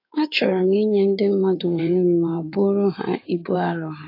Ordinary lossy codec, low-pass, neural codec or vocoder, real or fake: AAC, 32 kbps; 5.4 kHz; codec, 16 kHz in and 24 kHz out, 2.2 kbps, FireRedTTS-2 codec; fake